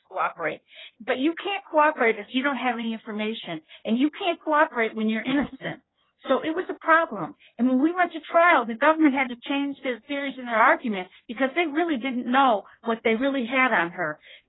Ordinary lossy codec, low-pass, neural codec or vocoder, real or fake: AAC, 16 kbps; 7.2 kHz; codec, 16 kHz in and 24 kHz out, 1.1 kbps, FireRedTTS-2 codec; fake